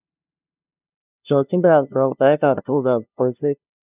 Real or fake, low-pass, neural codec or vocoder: fake; 3.6 kHz; codec, 16 kHz, 0.5 kbps, FunCodec, trained on LibriTTS, 25 frames a second